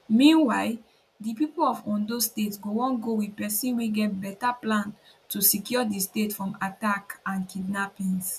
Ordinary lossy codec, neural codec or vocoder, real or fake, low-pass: none; none; real; 14.4 kHz